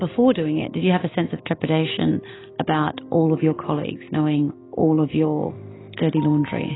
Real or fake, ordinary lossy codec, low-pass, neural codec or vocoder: real; AAC, 16 kbps; 7.2 kHz; none